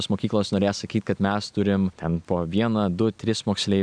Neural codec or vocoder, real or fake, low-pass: none; real; 9.9 kHz